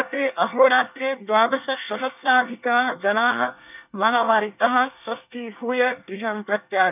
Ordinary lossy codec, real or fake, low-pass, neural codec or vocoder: none; fake; 3.6 kHz; codec, 24 kHz, 1 kbps, SNAC